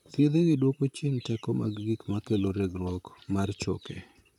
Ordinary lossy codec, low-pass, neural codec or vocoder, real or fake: none; 19.8 kHz; vocoder, 44.1 kHz, 128 mel bands, Pupu-Vocoder; fake